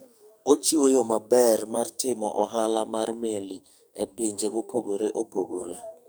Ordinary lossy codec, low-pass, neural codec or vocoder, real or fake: none; none; codec, 44.1 kHz, 2.6 kbps, SNAC; fake